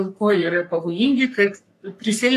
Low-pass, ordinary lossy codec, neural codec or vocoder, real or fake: 14.4 kHz; AAC, 64 kbps; codec, 44.1 kHz, 3.4 kbps, Pupu-Codec; fake